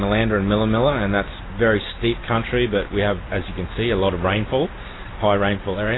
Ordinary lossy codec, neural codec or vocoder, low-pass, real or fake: AAC, 16 kbps; none; 7.2 kHz; real